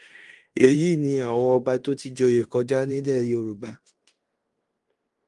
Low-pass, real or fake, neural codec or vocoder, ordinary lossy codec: 10.8 kHz; fake; codec, 16 kHz in and 24 kHz out, 0.9 kbps, LongCat-Audio-Codec, fine tuned four codebook decoder; Opus, 32 kbps